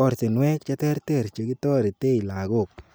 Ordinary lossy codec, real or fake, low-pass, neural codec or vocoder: none; real; none; none